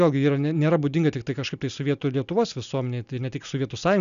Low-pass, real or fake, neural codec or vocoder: 7.2 kHz; real; none